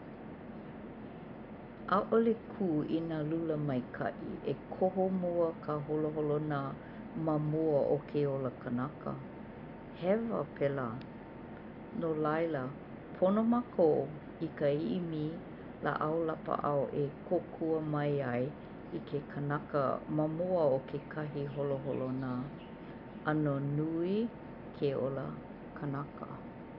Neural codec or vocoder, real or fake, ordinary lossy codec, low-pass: none; real; none; 5.4 kHz